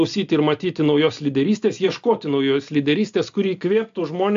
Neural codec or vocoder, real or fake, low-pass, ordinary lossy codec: none; real; 7.2 kHz; AAC, 64 kbps